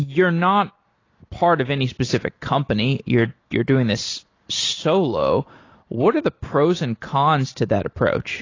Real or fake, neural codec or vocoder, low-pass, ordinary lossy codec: real; none; 7.2 kHz; AAC, 32 kbps